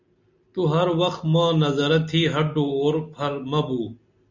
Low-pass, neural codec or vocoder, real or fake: 7.2 kHz; none; real